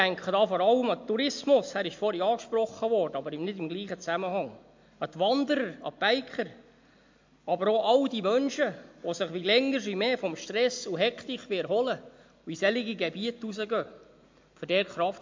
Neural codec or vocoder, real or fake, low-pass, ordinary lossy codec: none; real; 7.2 kHz; MP3, 48 kbps